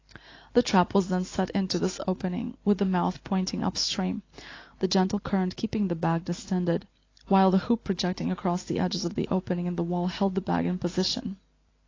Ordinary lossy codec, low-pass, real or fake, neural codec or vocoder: AAC, 32 kbps; 7.2 kHz; real; none